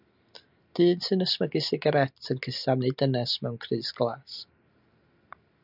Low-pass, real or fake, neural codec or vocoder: 5.4 kHz; real; none